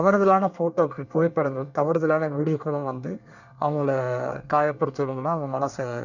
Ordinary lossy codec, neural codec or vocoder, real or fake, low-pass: none; codec, 24 kHz, 1 kbps, SNAC; fake; 7.2 kHz